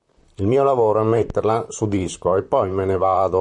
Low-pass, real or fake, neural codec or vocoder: 10.8 kHz; fake; vocoder, 44.1 kHz, 128 mel bands, Pupu-Vocoder